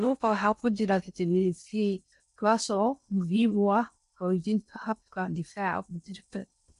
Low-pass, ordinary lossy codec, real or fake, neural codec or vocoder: 10.8 kHz; none; fake; codec, 16 kHz in and 24 kHz out, 0.6 kbps, FocalCodec, streaming, 4096 codes